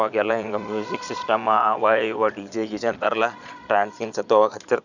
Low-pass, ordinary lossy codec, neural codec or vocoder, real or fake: 7.2 kHz; none; vocoder, 22.05 kHz, 80 mel bands, Vocos; fake